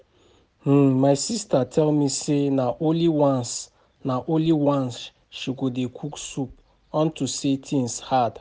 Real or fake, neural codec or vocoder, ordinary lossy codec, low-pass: real; none; none; none